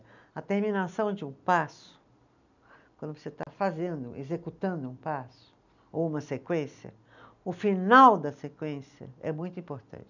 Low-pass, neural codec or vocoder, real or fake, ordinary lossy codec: 7.2 kHz; none; real; none